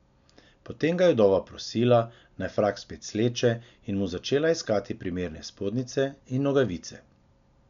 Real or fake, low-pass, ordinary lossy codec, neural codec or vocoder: real; 7.2 kHz; none; none